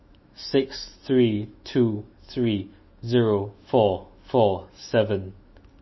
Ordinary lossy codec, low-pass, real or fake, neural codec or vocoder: MP3, 24 kbps; 7.2 kHz; fake; autoencoder, 48 kHz, 128 numbers a frame, DAC-VAE, trained on Japanese speech